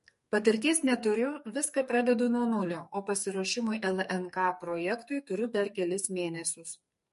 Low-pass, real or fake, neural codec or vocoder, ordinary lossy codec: 14.4 kHz; fake; codec, 44.1 kHz, 2.6 kbps, SNAC; MP3, 48 kbps